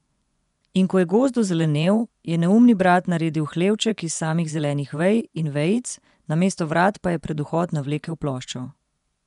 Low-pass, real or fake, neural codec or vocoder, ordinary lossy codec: 10.8 kHz; fake; vocoder, 24 kHz, 100 mel bands, Vocos; none